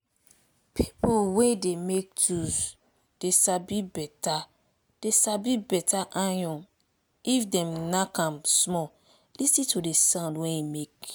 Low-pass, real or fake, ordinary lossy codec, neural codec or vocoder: none; real; none; none